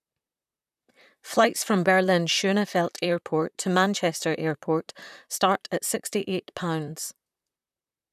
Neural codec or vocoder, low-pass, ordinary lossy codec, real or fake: vocoder, 44.1 kHz, 128 mel bands, Pupu-Vocoder; 14.4 kHz; none; fake